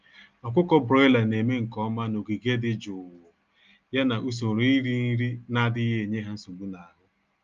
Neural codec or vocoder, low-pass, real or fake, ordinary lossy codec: none; 7.2 kHz; real; Opus, 32 kbps